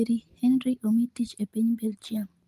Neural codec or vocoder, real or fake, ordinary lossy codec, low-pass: none; real; Opus, 24 kbps; 14.4 kHz